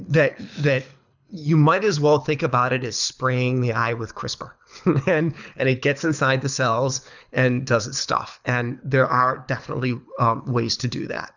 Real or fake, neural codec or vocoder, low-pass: fake; codec, 24 kHz, 6 kbps, HILCodec; 7.2 kHz